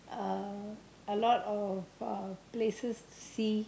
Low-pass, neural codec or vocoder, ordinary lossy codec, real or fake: none; none; none; real